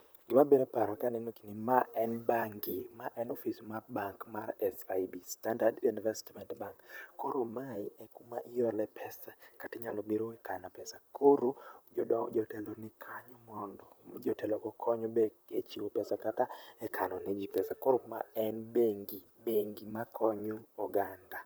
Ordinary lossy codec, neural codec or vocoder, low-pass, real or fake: none; vocoder, 44.1 kHz, 128 mel bands, Pupu-Vocoder; none; fake